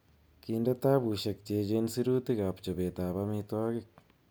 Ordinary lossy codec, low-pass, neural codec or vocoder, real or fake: none; none; none; real